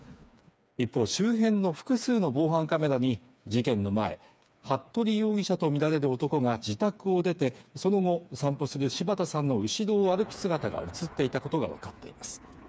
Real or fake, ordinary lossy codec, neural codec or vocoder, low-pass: fake; none; codec, 16 kHz, 4 kbps, FreqCodec, smaller model; none